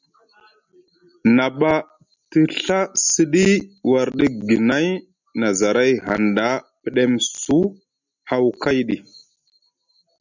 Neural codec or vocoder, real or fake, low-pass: none; real; 7.2 kHz